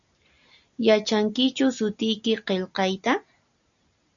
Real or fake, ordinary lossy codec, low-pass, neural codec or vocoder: real; MP3, 96 kbps; 7.2 kHz; none